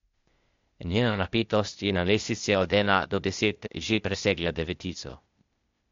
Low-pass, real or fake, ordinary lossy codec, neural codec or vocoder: 7.2 kHz; fake; MP3, 48 kbps; codec, 16 kHz, 0.8 kbps, ZipCodec